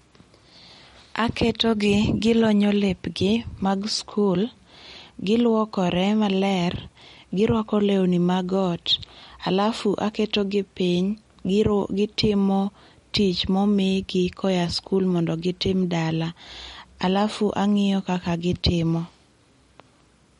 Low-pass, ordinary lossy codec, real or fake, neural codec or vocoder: 19.8 kHz; MP3, 48 kbps; real; none